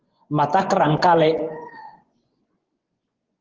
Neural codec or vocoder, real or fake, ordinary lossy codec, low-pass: vocoder, 24 kHz, 100 mel bands, Vocos; fake; Opus, 16 kbps; 7.2 kHz